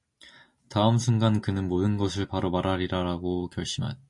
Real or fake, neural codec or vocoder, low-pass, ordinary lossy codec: real; none; 10.8 kHz; MP3, 48 kbps